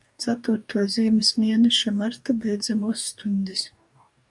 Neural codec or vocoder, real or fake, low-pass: codec, 44.1 kHz, 2.6 kbps, DAC; fake; 10.8 kHz